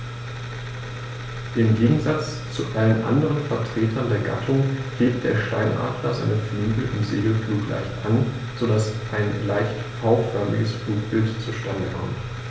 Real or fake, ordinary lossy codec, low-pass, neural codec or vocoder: real; none; none; none